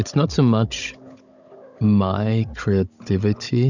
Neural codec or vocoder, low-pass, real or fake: codec, 16 kHz, 16 kbps, FunCodec, trained on Chinese and English, 50 frames a second; 7.2 kHz; fake